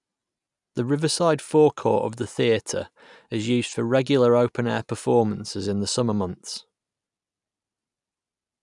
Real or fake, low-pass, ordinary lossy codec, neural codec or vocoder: real; 10.8 kHz; none; none